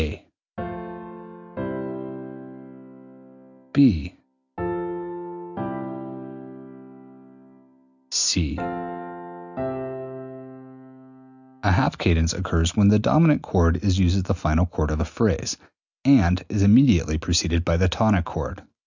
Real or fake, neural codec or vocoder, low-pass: real; none; 7.2 kHz